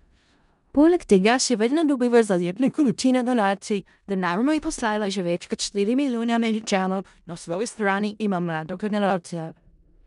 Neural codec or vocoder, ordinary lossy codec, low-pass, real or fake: codec, 16 kHz in and 24 kHz out, 0.4 kbps, LongCat-Audio-Codec, four codebook decoder; none; 10.8 kHz; fake